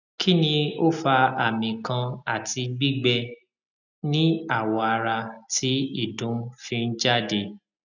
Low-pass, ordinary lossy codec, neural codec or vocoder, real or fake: 7.2 kHz; none; none; real